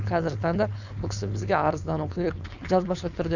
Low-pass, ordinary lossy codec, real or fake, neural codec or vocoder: 7.2 kHz; none; fake; codec, 16 kHz, 4.8 kbps, FACodec